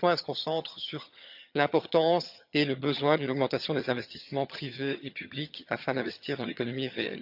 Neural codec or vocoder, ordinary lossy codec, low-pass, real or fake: vocoder, 22.05 kHz, 80 mel bands, HiFi-GAN; none; 5.4 kHz; fake